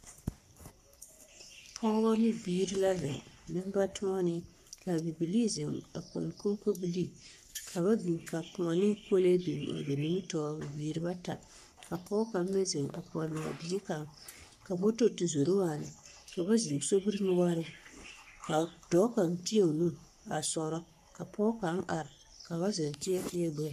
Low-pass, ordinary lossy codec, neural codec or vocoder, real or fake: 14.4 kHz; AAC, 96 kbps; codec, 44.1 kHz, 3.4 kbps, Pupu-Codec; fake